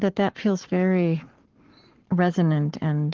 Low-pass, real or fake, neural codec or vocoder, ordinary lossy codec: 7.2 kHz; fake; codec, 44.1 kHz, 7.8 kbps, Pupu-Codec; Opus, 16 kbps